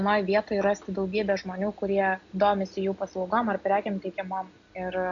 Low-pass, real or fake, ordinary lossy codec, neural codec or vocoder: 7.2 kHz; real; AAC, 64 kbps; none